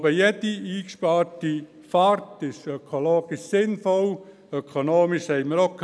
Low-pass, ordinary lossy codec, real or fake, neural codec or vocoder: none; none; real; none